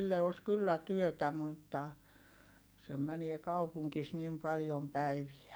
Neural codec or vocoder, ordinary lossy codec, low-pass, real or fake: codec, 44.1 kHz, 2.6 kbps, SNAC; none; none; fake